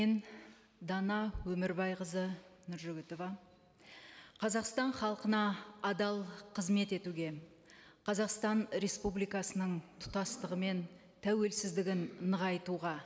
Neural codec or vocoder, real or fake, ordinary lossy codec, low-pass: none; real; none; none